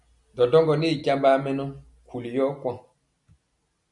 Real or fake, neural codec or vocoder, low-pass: real; none; 10.8 kHz